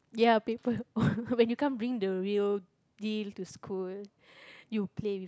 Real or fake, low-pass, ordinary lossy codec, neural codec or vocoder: real; none; none; none